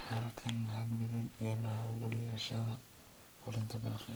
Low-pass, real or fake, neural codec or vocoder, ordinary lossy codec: none; fake; codec, 44.1 kHz, 3.4 kbps, Pupu-Codec; none